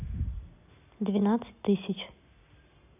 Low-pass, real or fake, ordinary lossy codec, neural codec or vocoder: 3.6 kHz; real; none; none